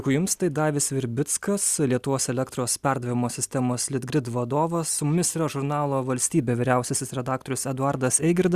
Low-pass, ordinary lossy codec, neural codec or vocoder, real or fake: 14.4 kHz; Opus, 64 kbps; none; real